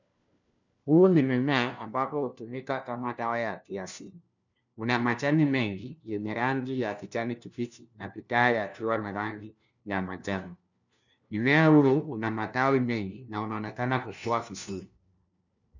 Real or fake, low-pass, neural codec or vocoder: fake; 7.2 kHz; codec, 16 kHz, 1 kbps, FunCodec, trained on LibriTTS, 50 frames a second